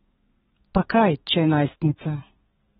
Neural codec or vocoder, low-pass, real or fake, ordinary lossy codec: codec, 32 kHz, 1.9 kbps, SNAC; 14.4 kHz; fake; AAC, 16 kbps